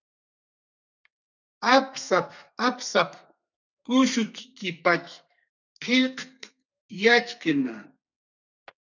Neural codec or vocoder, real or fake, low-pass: codec, 32 kHz, 1.9 kbps, SNAC; fake; 7.2 kHz